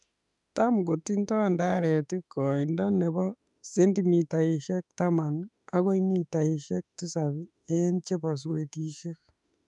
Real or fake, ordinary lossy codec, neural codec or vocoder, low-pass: fake; none; autoencoder, 48 kHz, 32 numbers a frame, DAC-VAE, trained on Japanese speech; 10.8 kHz